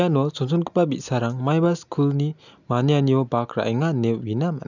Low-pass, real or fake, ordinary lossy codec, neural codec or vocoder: 7.2 kHz; real; none; none